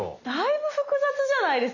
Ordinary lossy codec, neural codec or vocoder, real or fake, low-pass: none; none; real; 7.2 kHz